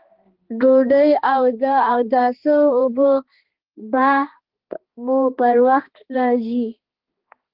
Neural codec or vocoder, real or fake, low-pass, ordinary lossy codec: codec, 16 kHz, 2 kbps, X-Codec, HuBERT features, trained on balanced general audio; fake; 5.4 kHz; Opus, 16 kbps